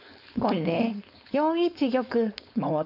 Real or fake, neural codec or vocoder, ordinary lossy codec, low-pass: fake; codec, 16 kHz, 4.8 kbps, FACodec; none; 5.4 kHz